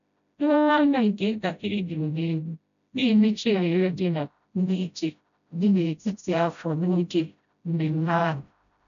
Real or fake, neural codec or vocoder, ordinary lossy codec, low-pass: fake; codec, 16 kHz, 0.5 kbps, FreqCodec, smaller model; none; 7.2 kHz